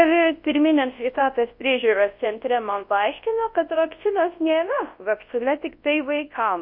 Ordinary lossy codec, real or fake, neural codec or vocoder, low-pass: MP3, 32 kbps; fake; codec, 24 kHz, 0.9 kbps, WavTokenizer, large speech release; 5.4 kHz